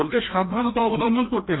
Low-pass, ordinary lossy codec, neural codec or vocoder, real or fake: 7.2 kHz; AAC, 16 kbps; codec, 16 kHz, 1 kbps, FreqCodec, larger model; fake